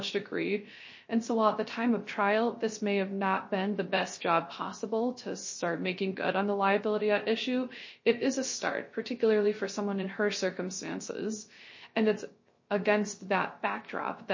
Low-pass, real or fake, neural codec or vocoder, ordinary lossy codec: 7.2 kHz; fake; codec, 16 kHz, 0.3 kbps, FocalCodec; MP3, 32 kbps